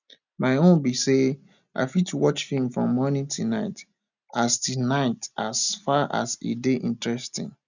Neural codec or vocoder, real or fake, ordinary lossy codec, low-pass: none; real; none; 7.2 kHz